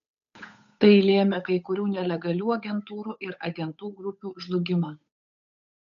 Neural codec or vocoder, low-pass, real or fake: codec, 16 kHz, 8 kbps, FunCodec, trained on Chinese and English, 25 frames a second; 7.2 kHz; fake